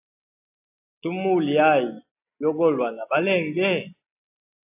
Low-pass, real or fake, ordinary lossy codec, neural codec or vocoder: 3.6 kHz; real; AAC, 32 kbps; none